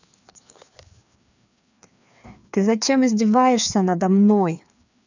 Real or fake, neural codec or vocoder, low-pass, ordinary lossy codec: fake; codec, 16 kHz, 2 kbps, FreqCodec, larger model; 7.2 kHz; none